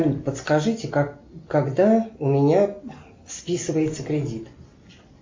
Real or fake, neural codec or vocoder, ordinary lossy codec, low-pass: real; none; MP3, 48 kbps; 7.2 kHz